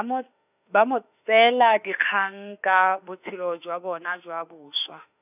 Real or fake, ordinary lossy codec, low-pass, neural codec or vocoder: fake; none; 3.6 kHz; autoencoder, 48 kHz, 32 numbers a frame, DAC-VAE, trained on Japanese speech